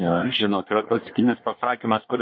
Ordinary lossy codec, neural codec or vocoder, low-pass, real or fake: MP3, 32 kbps; codec, 24 kHz, 1 kbps, SNAC; 7.2 kHz; fake